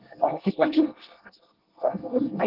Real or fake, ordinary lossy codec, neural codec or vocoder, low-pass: fake; Opus, 16 kbps; codec, 24 kHz, 1 kbps, SNAC; 5.4 kHz